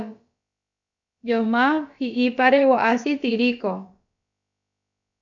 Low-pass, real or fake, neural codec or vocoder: 7.2 kHz; fake; codec, 16 kHz, about 1 kbps, DyCAST, with the encoder's durations